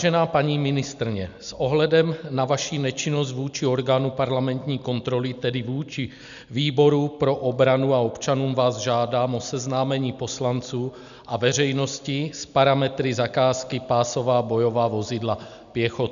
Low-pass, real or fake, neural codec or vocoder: 7.2 kHz; real; none